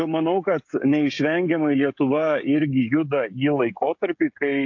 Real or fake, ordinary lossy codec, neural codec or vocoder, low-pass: fake; AAC, 48 kbps; codec, 16 kHz, 16 kbps, FreqCodec, smaller model; 7.2 kHz